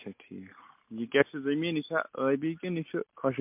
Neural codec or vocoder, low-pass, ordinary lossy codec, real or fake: none; 3.6 kHz; MP3, 32 kbps; real